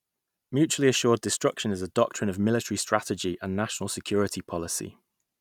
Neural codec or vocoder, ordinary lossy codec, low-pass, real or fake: none; none; 19.8 kHz; real